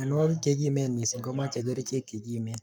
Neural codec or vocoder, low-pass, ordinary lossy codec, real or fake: codec, 44.1 kHz, 7.8 kbps, Pupu-Codec; 19.8 kHz; none; fake